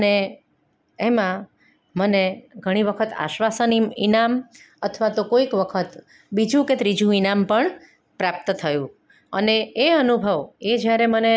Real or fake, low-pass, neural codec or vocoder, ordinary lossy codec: real; none; none; none